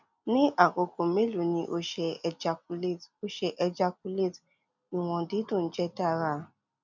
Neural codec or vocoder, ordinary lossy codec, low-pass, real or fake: none; none; 7.2 kHz; real